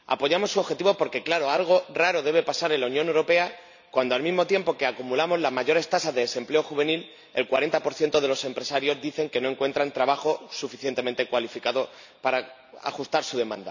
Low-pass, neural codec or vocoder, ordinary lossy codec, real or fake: 7.2 kHz; none; none; real